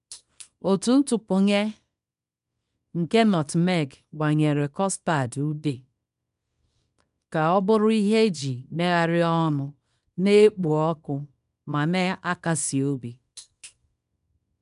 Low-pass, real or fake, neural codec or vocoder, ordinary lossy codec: 10.8 kHz; fake; codec, 24 kHz, 0.9 kbps, WavTokenizer, small release; none